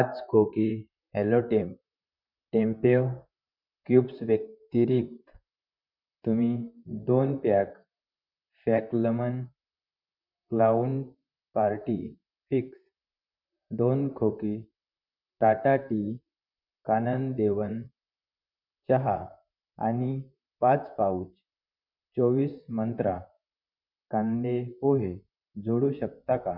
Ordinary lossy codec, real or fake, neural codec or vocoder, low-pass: none; fake; vocoder, 44.1 kHz, 128 mel bands, Pupu-Vocoder; 5.4 kHz